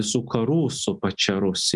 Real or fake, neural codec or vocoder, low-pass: real; none; 10.8 kHz